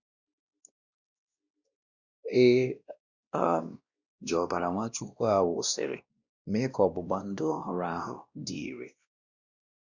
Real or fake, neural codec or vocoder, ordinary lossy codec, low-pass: fake; codec, 16 kHz, 1 kbps, X-Codec, WavLM features, trained on Multilingual LibriSpeech; Opus, 64 kbps; 7.2 kHz